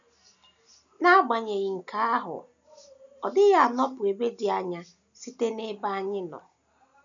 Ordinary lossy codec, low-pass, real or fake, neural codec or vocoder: none; 7.2 kHz; real; none